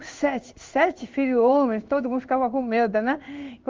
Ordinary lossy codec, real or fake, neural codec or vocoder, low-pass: Opus, 32 kbps; fake; codec, 16 kHz in and 24 kHz out, 1 kbps, XY-Tokenizer; 7.2 kHz